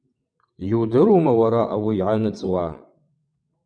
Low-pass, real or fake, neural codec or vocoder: 9.9 kHz; fake; vocoder, 22.05 kHz, 80 mel bands, WaveNeXt